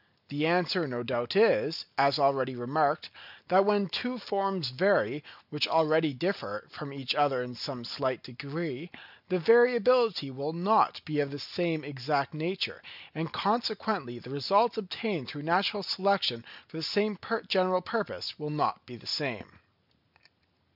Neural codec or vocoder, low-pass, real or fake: none; 5.4 kHz; real